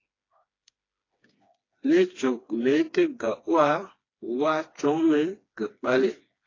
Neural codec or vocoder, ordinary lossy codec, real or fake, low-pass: codec, 16 kHz, 2 kbps, FreqCodec, smaller model; AAC, 32 kbps; fake; 7.2 kHz